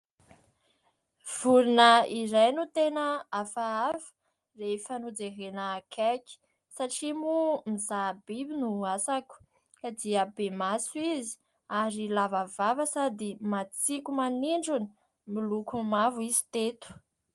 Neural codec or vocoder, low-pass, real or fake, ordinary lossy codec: none; 10.8 kHz; real; Opus, 32 kbps